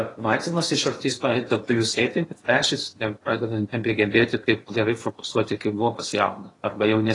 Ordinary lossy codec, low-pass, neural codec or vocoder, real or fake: AAC, 32 kbps; 10.8 kHz; codec, 16 kHz in and 24 kHz out, 0.8 kbps, FocalCodec, streaming, 65536 codes; fake